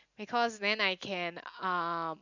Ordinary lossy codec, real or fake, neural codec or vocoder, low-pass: none; real; none; 7.2 kHz